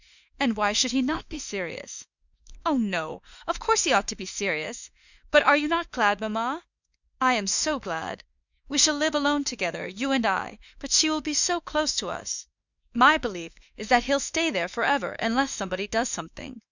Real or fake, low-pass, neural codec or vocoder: fake; 7.2 kHz; autoencoder, 48 kHz, 32 numbers a frame, DAC-VAE, trained on Japanese speech